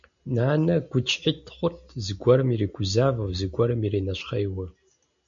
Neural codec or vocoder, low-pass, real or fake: none; 7.2 kHz; real